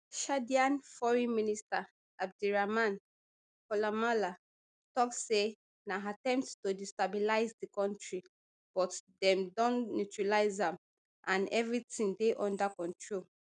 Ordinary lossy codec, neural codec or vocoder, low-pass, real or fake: none; none; 9.9 kHz; real